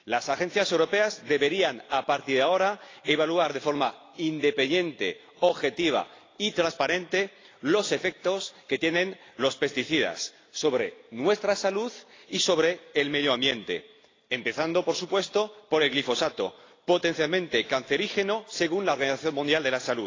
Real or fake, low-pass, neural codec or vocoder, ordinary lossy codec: real; 7.2 kHz; none; AAC, 32 kbps